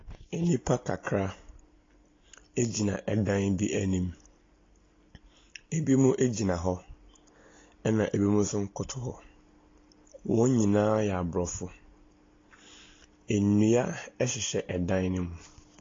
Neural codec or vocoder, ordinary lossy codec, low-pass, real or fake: none; AAC, 32 kbps; 7.2 kHz; real